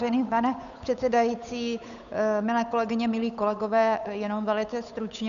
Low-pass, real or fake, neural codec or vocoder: 7.2 kHz; fake; codec, 16 kHz, 8 kbps, FunCodec, trained on Chinese and English, 25 frames a second